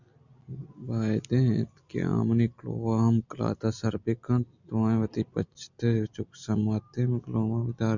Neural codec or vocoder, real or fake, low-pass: none; real; 7.2 kHz